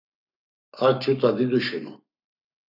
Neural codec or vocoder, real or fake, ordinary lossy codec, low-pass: codec, 44.1 kHz, 7.8 kbps, Pupu-Codec; fake; AAC, 48 kbps; 5.4 kHz